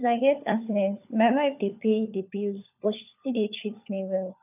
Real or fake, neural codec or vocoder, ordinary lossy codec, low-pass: fake; codec, 16 kHz, 4 kbps, FunCodec, trained on LibriTTS, 50 frames a second; none; 3.6 kHz